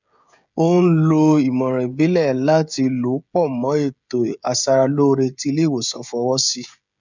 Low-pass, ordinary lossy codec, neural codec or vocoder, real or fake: 7.2 kHz; none; codec, 16 kHz, 16 kbps, FreqCodec, smaller model; fake